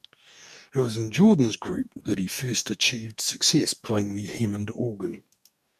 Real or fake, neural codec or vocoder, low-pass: fake; codec, 44.1 kHz, 2.6 kbps, DAC; 14.4 kHz